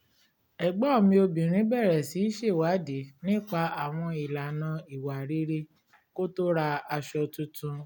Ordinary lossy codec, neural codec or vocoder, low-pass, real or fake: none; none; none; real